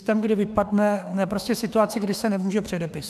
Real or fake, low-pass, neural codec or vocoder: fake; 14.4 kHz; autoencoder, 48 kHz, 32 numbers a frame, DAC-VAE, trained on Japanese speech